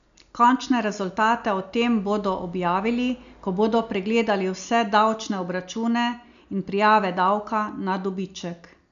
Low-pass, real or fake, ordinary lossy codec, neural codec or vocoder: 7.2 kHz; real; none; none